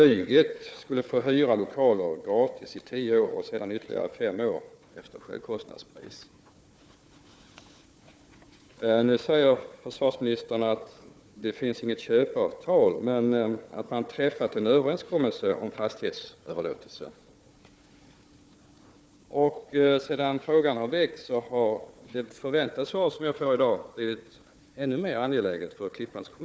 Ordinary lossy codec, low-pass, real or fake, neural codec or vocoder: none; none; fake; codec, 16 kHz, 4 kbps, FunCodec, trained on Chinese and English, 50 frames a second